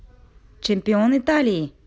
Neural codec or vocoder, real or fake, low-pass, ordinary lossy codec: none; real; none; none